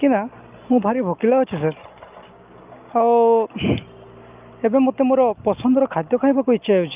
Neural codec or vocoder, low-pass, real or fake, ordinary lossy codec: none; 3.6 kHz; real; Opus, 32 kbps